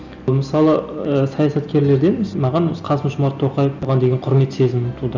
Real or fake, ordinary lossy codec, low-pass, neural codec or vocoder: real; none; 7.2 kHz; none